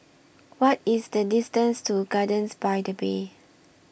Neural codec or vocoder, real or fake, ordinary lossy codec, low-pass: none; real; none; none